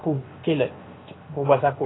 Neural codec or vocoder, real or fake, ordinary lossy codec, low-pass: codec, 16 kHz, 0.7 kbps, FocalCodec; fake; AAC, 16 kbps; 7.2 kHz